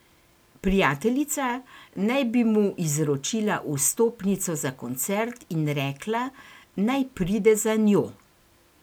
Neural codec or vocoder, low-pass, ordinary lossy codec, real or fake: none; none; none; real